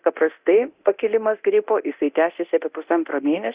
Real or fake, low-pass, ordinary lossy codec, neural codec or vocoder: fake; 3.6 kHz; Opus, 64 kbps; codec, 24 kHz, 0.9 kbps, DualCodec